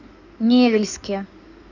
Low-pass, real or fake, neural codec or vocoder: 7.2 kHz; fake; codec, 16 kHz in and 24 kHz out, 2.2 kbps, FireRedTTS-2 codec